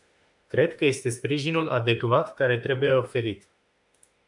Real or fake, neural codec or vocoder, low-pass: fake; autoencoder, 48 kHz, 32 numbers a frame, DAC-VAE, trained on Japanese speech; 10.8 kHz